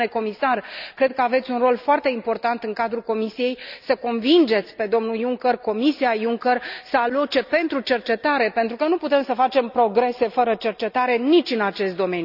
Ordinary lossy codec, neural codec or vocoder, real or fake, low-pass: none; none; real; 5.4 kHz